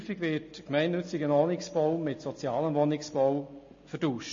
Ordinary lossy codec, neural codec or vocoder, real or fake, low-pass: MP3, 32 kbps; none; real; 7.2 kHz